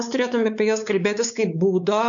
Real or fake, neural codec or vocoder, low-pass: fake; codec, 16 kHz, 4 kbps, X-Codec, WavLM features, trained on Multilingual LibriSpeech; 7.2 kHz